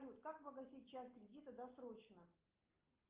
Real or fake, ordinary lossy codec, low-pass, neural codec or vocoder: real; Opus, 32 kbps; 3.6 kHz; none